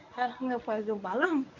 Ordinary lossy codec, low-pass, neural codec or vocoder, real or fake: none; 7.2 kHz; codec, 24 kHz, 0.9 kbps, WavTokenizer, medium speech release version 1; fake